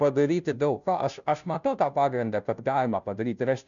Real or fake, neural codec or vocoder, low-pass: fake; codec, 16 kHz, 0.5 kbps, FunCodec, trained on Chinese and English, 25 frames a second; 7.2 kHz